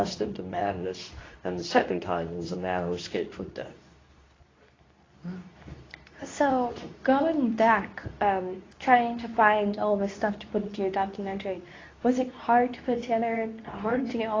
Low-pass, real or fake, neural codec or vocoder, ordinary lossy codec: 7.2 kHz; fake; codec, 24 kHz, 0.9 kbps, WavTokenizer, medium speech release version 2; AAC, 32 kbps